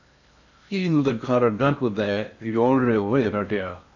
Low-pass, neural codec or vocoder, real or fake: 7.2 kHz; codec, 16 kHz in and 24 kHz out, 0.6 kbps, FocalCodec, streaming, 4096 codes; fake